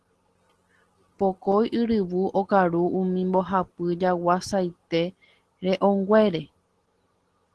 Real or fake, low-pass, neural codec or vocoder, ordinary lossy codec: real; 10.8 kHz; none; Opus, 16 kbps